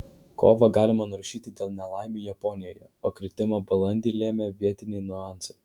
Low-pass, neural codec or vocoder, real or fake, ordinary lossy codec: 19.8 kHz; autoencoder, 48 kHz, 128 numbers a frame, DAC-VAE, trained on Japanese speech; fake; Opus, 64 kbps